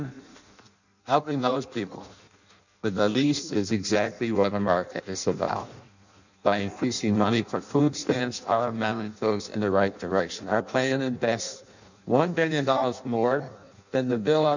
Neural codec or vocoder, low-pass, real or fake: codec, 16 kHz in and 24 kHz out, 0.6 kbps, FireRedTTS-2 codec; 7.2 kHz; fake